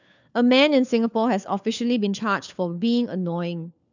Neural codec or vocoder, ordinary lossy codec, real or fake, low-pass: codec, 16 kHz, 4 kbps, FunCodec, trained on LibriTTS, 50 frames a second; none; fake; 7.2 kHz